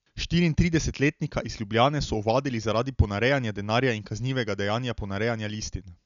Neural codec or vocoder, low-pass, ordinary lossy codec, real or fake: none; 7.2 kHz; none; real